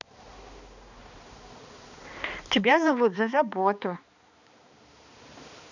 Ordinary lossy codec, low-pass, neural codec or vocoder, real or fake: none; 7.2 kHz; codec, 16 kHz, 2 kbps, X-Codec, HuBERT features, trained on balanced general audio; fake